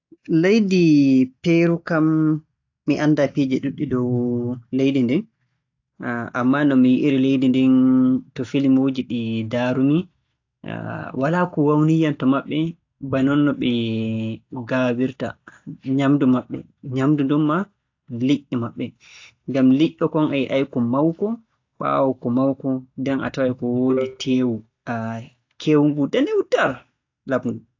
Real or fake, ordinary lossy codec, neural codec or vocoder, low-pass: real; AAC, 48 kbps; none; 7.2 kHz